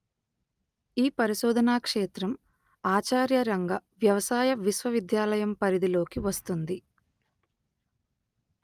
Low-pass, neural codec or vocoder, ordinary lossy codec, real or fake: 14.4 kHz; none; Opus, 32 kbps; real